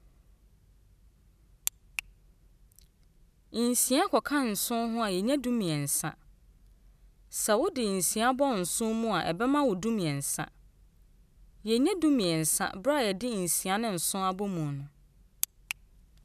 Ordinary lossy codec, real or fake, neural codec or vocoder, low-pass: none; real; none; 14.4 kHz